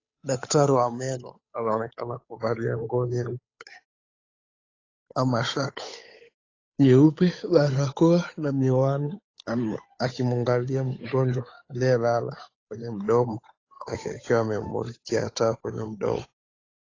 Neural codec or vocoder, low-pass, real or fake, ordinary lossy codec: codec, 16 kHz, 8 kbps, FunCodec, trained on Chinese and English, 25 frames a second; 7.2 kHz; fake; AAC, 32 kbps